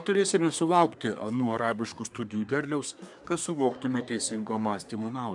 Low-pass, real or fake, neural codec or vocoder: 10.8 kHz; fake; codec, 24 kHz, 1 kbps, SNAC